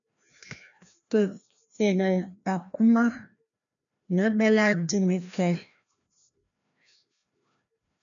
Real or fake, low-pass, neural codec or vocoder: fake; 7.2 kHz; codec, 16 kHz, 1 kbps, FreqCodec, larger model